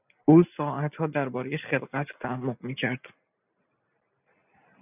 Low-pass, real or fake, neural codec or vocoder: 3.6 kHz; real; none